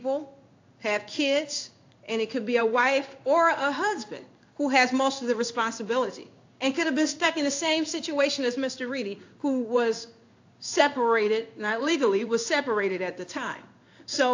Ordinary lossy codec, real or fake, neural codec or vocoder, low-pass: AAC, 48 kbps; fake; codec, 16 kHz in and 24 kHz out, 1 kbps, XY-Tokenizer; 7.2 kHz